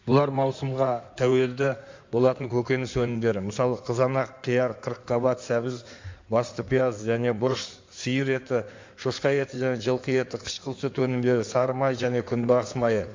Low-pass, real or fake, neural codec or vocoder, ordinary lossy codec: 7.2 kHz; fake; codec, 16 kHz in and 24 kHz out, 2.2 kbps, FireRedTTS-2 codec; MP3, 64 kbps